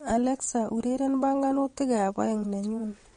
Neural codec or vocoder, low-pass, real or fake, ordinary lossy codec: vocoder, 22.05 kHz, 80 mel bands, WaveNeXt; 9.9 kHz; fake; MP3, 48 kbps